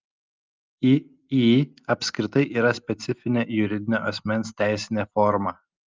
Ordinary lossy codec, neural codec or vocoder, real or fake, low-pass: Opus, 32 kbps; none; real; 7.2 kHz